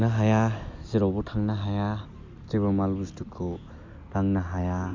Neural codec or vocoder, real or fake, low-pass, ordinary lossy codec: none; real; 7.2 kHz; MP3, 64 kbps